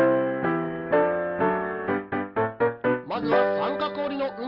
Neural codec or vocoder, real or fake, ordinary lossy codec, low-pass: none; real; Opus, 24 kbps; 5.4 kHz